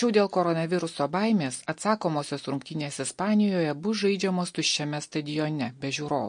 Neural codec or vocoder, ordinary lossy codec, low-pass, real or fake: none; MP3, 48 kbps; 9.9 kHz; real